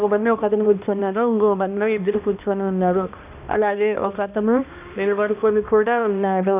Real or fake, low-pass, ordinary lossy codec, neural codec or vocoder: fake; 3.6 kHz; none; codec, 16 kHz, 1 kbps, X-Codec, HuBERT features, trained on balanced general audio